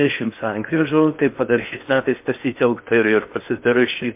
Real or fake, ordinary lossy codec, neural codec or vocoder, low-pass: fake; MP3, 32 kbps; codec, 16 kHz in and 24 kHz out, 0.6 kbps, FocalCodec, streaming, 4096 codes; 3.6 kHz